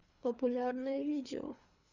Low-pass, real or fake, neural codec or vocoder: 7.2 kHz; fake; codec, 24 kHz, 3 kbps, HILCodec